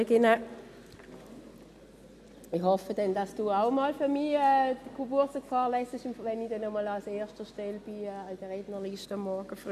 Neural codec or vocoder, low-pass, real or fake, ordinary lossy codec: none; 14.4 kHz; real; none